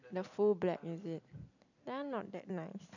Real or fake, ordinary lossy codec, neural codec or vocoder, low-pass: fake; none; vocoder, 44.1 kHz, 128 mel bands every 256 samples, BigVGAN v2; 7.2 kHz